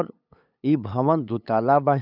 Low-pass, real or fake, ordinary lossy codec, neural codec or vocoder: 5.4 kHz; fake; none; codec, 16 kHz, 8 kbps, FunCodec, trained on LibriTTS, 25 frames a second